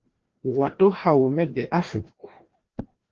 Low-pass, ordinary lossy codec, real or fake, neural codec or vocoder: 7.2 kHz; Opus, 16 kbps; fake; codec, 16 kHz, 1 kbps, FreqCodec, larger model